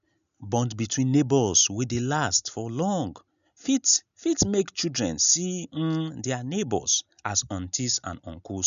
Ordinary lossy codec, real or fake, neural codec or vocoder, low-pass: none; real; none; 7.2 kHz